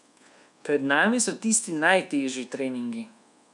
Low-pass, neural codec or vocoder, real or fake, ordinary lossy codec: 10.8 kHz; codec, 24 kHz, 1.2 kbps, DualCodec; fake; none